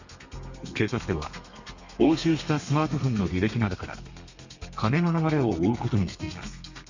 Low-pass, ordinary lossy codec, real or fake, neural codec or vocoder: 7.2 kHz; Opus, 64 kbps; fake; codec, 32 kHz, 1.9 kbps, SNAC